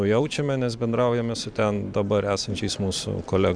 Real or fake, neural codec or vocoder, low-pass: fake; vocoder, 44.1 kHz, 128 mel bands every 512 samples, BigVGAN v2; 9.9 kHz